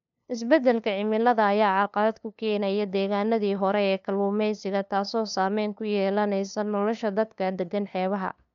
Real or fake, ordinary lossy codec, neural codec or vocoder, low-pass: fake; MP3, 96 kbps; codec, 16 kHz, 2 kbps, FunCodec, trained on LibriTTS, 25 frames a second; 7.2 kHz